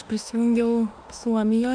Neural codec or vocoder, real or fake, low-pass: autoencoder, 48 kHz, 32 numbers a frame, DAC-VAE, trained on Japanese speech; fake; 9.9 kHz